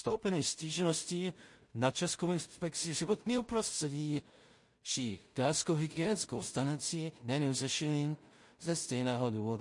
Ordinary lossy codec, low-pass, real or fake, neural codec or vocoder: MP3, 48 kbps; 10.8 kHz; fake; codec, 16 kHz in and 24 kHz out, 0.4 kbps, LongCat-Audio-Codec, two codebook decoder